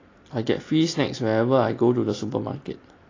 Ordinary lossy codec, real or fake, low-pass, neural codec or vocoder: AAC, 32 kbps; real; 7.2 kHz; none